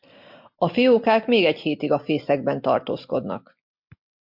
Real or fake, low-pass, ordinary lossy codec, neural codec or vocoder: real; 5.4 kHz; MP3, 48 kbps; none